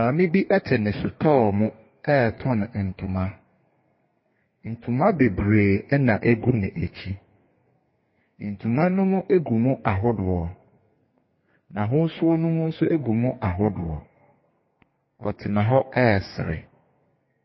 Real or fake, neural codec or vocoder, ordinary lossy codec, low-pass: fake; codec, 32 kHz, 1.9 kbps, SNAC; MP3, 24 kbps; 7.2 kHz